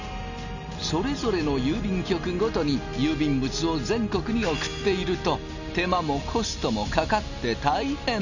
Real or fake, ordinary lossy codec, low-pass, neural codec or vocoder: real; none; 7.2 kHz; none